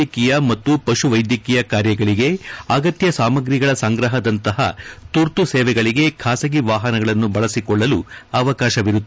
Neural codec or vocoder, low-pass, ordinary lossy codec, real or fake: none; none; none; real